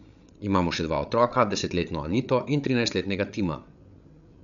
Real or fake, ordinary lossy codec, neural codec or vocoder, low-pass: fake; MP3, 96 kbps; codec, 16 kHz, 8 kbps, FreqCodec, larger model; 7.2 kHz